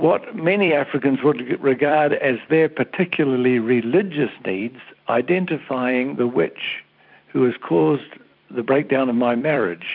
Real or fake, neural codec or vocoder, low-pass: fake; vocoder, 44.1 kHz, 128 mel bands every 256 samples, BigVGAN v2; 5.4 kHz